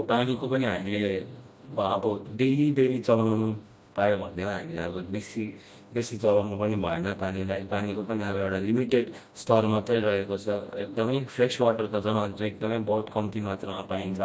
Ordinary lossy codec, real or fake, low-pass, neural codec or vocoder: none; fake; none; codec, 16 kHz, 1 kbps, FreqCodec, smaller model